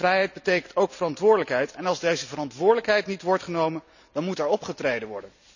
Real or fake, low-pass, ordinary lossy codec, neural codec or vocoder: real; 7.2 kHz; none; none